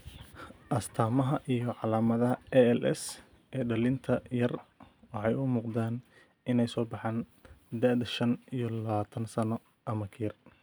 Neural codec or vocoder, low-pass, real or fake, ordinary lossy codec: none; none; real; none